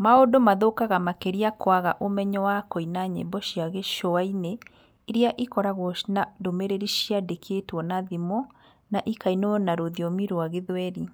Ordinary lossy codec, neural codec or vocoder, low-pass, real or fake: none; none; none; real